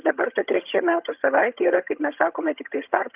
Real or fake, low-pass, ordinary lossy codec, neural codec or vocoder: fake; 3.6 kHz; Opus, 24 kbps; vocoder, 22.05 kHz, 80 mel bands, HiFi-GAN